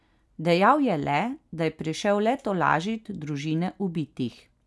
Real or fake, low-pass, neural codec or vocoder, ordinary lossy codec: real; none; none; none